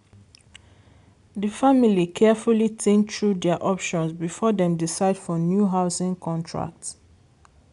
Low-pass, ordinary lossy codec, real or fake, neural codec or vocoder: 10.8 kHz; none; real; none